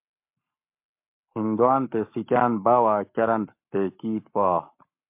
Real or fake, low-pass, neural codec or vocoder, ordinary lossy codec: fake; 3.6 kHz; codec, 44.1 kHz, 7.8 kbps, Pupu-Codec; MP3, 32 kbps